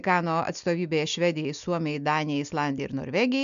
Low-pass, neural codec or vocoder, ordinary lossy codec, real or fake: 7.2 kHz; none; MP3, 96 kbps; real